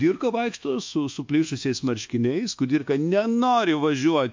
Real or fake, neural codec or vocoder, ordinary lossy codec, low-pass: fake; codec, 24 kHz, 1.2 kbps, DualCodec; MP3, 48 kbps; 7.2 kHz